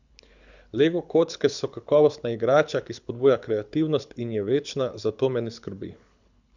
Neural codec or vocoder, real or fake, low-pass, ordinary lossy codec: codec, 24 kHz, 6 kbps, HILCodec; fake; 7.2 kHz; none